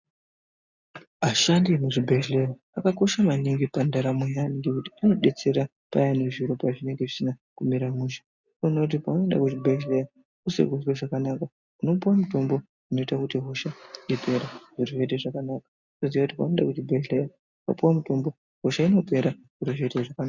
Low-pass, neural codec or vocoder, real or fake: 7.2 kHz; none; real